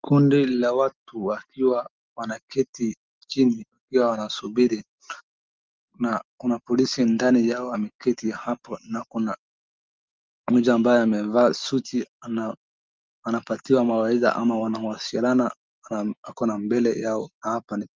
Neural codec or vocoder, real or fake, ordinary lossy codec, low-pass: none; real; Opus, 32 kbps; 7.2 kHz